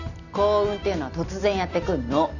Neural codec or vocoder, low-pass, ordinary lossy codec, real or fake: none; 7.2 kHz; none; real